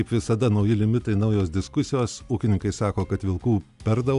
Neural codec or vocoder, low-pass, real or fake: none; 10.8 kHz; real